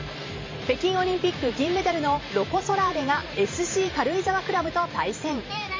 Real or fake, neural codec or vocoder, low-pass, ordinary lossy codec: fake; vocoder, 44.1 kHz, 128 mel bands every 512 samples, BigVGAN v2; 7.2 kHz; MP3, 32 kbps